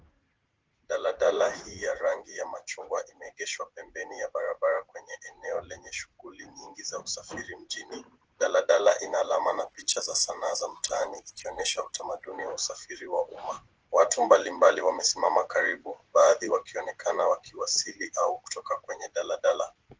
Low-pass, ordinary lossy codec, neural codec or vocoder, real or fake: 7.2 kHz; Opus, 16 kbps; vocoder, 44.1 kHz, 80 mel bands, Vocos; fake